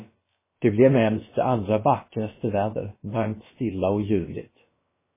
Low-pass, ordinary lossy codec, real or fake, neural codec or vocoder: 3.6 kHz; MP3, 16 kbps; fake; codec, 16 kHz, about 1 kbps, DyCAST, with the encoder's durations